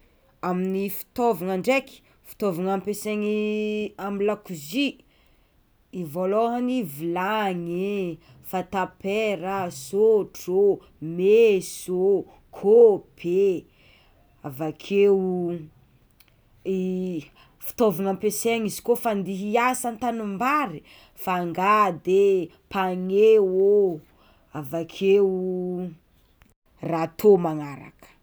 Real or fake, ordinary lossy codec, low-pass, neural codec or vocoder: real; none; none; none